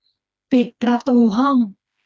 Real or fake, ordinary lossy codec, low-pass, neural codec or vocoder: fake; none; none; codec, 16 kHz, 2 kbps, FreqCodec, smaller model